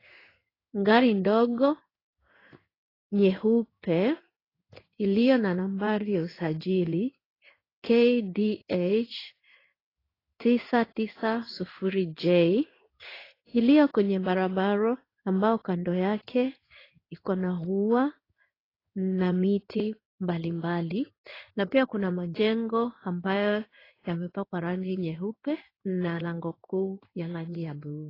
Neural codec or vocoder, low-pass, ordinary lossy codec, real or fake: codec, 16 kHz in and 24 kHz out, 1 kbps, XY-Tokenizer; 5.4 kHz; AAC, 24 kbps; fake